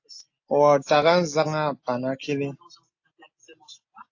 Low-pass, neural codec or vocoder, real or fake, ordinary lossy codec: 7.2 kHz; none; real; AAC, 48 kbps